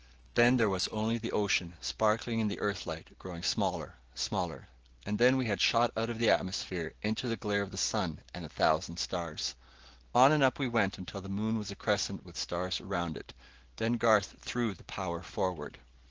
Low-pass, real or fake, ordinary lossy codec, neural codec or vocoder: 7.2 kHz; real; Opus, 16 kbps; none